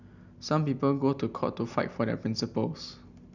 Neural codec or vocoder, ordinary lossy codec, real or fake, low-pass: none; none; real; 7.2 kHz